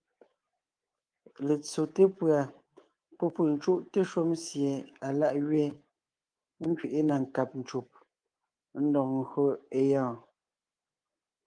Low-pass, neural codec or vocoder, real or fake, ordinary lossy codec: 9.9 kHz; codec, 24 kHz, 3.1 kbps, DualCodec; fake; Opus, 32 kbps